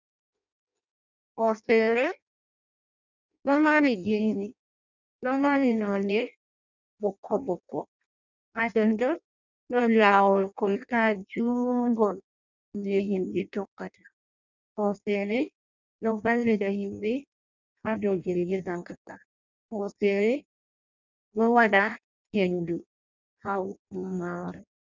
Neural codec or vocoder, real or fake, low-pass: codec, 16 kHz in and 24 kHz out, 0.6 kbps, FireRedTTS-2 codec; fake; 7.2 kHz